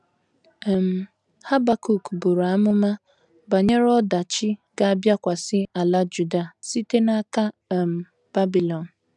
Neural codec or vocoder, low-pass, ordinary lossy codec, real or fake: none; 10.8 kHz; none; real